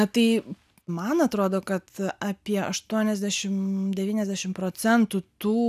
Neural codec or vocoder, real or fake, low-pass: none; real; 14.4 kHz